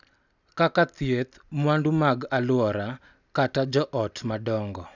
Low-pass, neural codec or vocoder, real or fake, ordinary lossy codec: 7.2 kHz; none; real; none